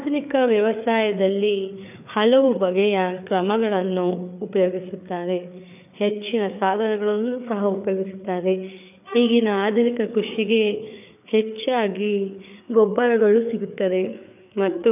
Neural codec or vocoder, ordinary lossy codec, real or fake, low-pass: codec, 16 kHz, 4 kbps, FreqCodec, larger model; none; fake; 3.6 kHz